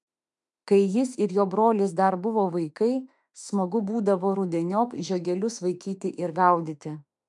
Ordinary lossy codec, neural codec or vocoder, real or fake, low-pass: MP3, 96 kbps; autoencoder, 48 kHz, 32 numbers a frame, DAC-VAE, trained on Japanese speech; fake; 10.8 kHz